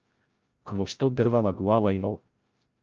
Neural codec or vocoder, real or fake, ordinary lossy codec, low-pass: codec, 16 kHz, 0.5 kbps, FreqCodec, larger model; fake; Opus, 24 kbps; 7.2 kHz